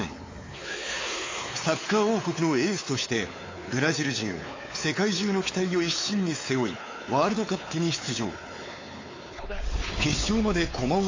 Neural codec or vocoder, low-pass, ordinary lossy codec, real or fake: codec, 16 kHz, 4 kbps, X-Codec, WavLM features, trained on Multilingual LibriSpeech; 7.2 kHz; AAC, 32 kbps; fake